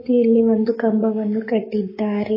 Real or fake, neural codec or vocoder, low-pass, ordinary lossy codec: fake; codec, 44.1 kHz, 7.8 kbps, Pupu-Codec; 5.4 kHz; MP3, 24 kbps